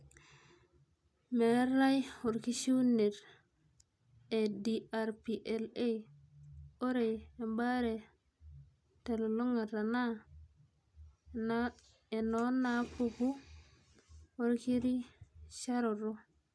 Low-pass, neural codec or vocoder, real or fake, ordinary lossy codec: none; none; real; none